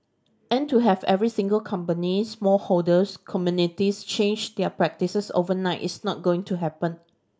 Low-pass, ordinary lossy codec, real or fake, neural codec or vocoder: none; none; real; none